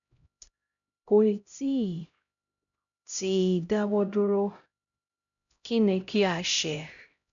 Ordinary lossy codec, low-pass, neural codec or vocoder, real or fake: none; 7.2 kHz; codec, 16 kHz, 0.5 kbps, X-Codec, HuBERT features, trained on LibriSpeech; fake